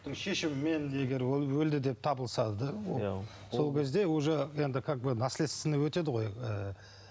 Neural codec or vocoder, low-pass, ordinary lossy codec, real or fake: none; none; none; real